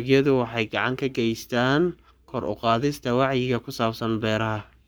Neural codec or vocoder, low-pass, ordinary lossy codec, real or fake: codec, 44.1 kHz, 7.8 kbps, Pupu-Codec; none; none; fake